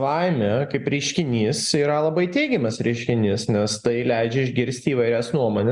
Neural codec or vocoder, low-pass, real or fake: none; 10.8 kHz; real